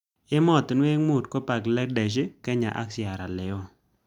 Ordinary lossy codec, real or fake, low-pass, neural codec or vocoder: none; real; 19.8 kHz; none